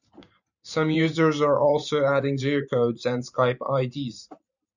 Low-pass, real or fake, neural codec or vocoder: 7.2 kHz; fake; vocoder, 44.1 kHz, 128 mel bands every 512 samples, BigVGAN v2